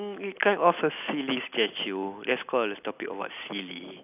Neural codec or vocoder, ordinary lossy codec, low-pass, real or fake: none; none; 3.6 kHz; real